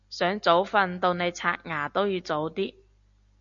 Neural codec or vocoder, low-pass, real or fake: none; 7.2 kHz; real